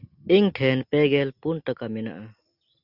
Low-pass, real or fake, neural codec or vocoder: 5.4 kHz; real; none